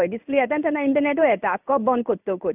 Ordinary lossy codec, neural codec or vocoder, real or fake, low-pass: AAC, 32 kbps; codec, 16 kHz in and 24 kHz out, 1 kbps, XY-Tokenizer; fake; 3.6 kHz